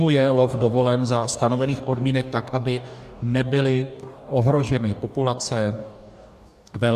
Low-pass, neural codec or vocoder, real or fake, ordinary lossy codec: 14.4 kHz; codec, 44.1 kHz, 2.6 kbps, DAC; fake; AAC, 96 kbps